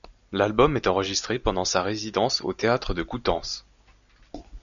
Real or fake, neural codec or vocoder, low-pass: real; none; 7.2 kHz